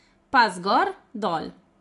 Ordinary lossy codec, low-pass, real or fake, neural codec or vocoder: AAC, 48 kbps; 10.8 kHz; real; none